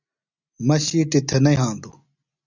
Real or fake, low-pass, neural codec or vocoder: real; 7.2 kHz; none